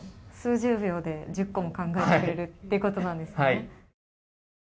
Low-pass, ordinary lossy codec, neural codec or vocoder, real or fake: none; none; none; real